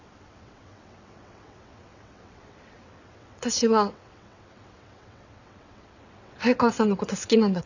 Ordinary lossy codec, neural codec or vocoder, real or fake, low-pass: none; vocoder, 22.05 kHz, 80 mel bands, WaveNeXt; fake; 7.2 kHz